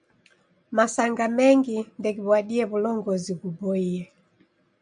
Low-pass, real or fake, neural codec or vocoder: 9.9 kHz; real; none